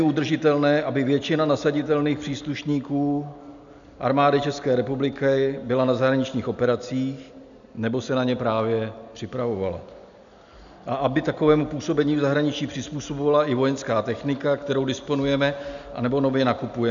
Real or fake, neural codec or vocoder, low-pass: real; none; 7.2 kHz